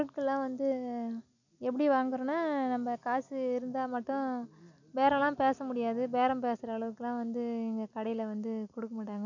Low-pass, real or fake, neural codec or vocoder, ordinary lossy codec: 7.2 kHz; real; none; none